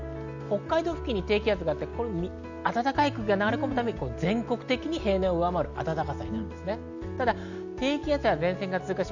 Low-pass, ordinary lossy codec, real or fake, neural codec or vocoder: 7.2 kHz; none; real; none